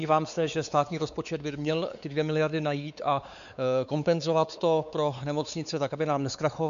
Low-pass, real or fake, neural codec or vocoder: 7.2 kHz; fake; codec, 16 kHz, 4 kbps, X-Codec, WavLM features, trained on Multilingual LibriSpeech